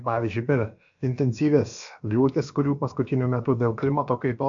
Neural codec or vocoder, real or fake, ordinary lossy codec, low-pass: codec, 16 kHz, about 1 kbps, DyCAST, with the encoder's durations; fake; AAC, 64 kbps; 7.2 kHz